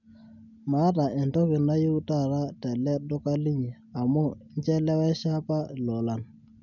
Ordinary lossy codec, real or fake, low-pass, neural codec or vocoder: none; real; 7.2 kHz; none